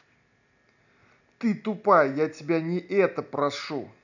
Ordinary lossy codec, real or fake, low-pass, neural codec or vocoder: none; real; 7.2 kHz; none